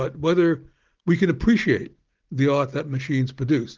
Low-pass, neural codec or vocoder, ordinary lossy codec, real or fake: 7.2 kHz; none; Opus, 16 kbps; real